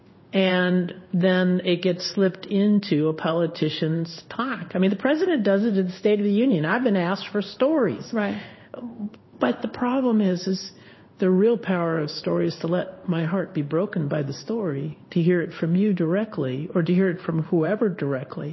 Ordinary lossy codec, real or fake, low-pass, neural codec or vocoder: MP3, 24 kbps; fake; 7.2 kHz; codec, 16 kHz in and 24 kHz out, 1 kbps, XY-Tokenizer